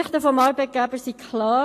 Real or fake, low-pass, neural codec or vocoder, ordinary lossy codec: real; 14.4 kHz; none; AAC, 48 kbps